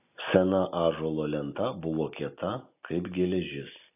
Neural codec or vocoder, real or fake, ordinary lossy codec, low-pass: none; real; AAC, 32 kbps; 3.6 kHz